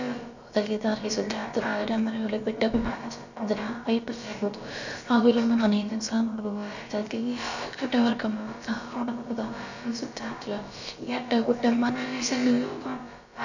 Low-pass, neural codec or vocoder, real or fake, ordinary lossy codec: 7.2 kHz; codec, 16 kHz, about 1 kbps, DyCAST, with the encoder's durations; fake; none